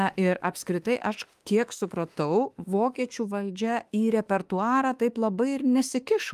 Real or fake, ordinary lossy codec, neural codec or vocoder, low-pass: fake; Opus, 32 kbps; autoencoder, 48 kHz, 32 numbers a frame, DAC-VAE, trained on Japanese speech; 14.4 kHz